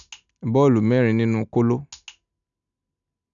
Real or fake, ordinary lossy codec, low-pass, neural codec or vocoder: real; none; 7.2 kHz; none